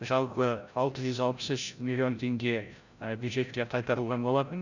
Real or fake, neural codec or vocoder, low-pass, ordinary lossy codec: fake; codec, 16 kHz, 0.5 kbps, FreqCodec, larger model; 7.2 kHz; none